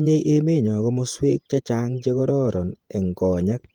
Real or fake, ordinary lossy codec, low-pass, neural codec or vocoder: fake; Opus, 32 kbps; 19.8 kHz; vocoder, 48 kHz, 128 mel bands, Vocos